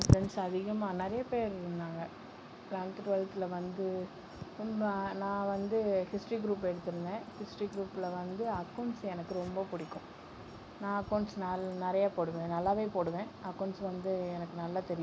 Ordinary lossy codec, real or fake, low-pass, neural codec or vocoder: none; real; none; none